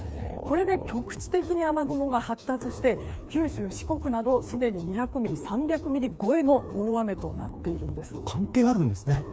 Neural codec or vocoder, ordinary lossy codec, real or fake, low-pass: codec, 16 kHz, 2 kbps, FreqCodec, larger model; none; fake; none